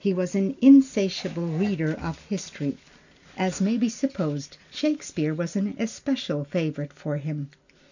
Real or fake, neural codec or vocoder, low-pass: real; none; 7.2 kHz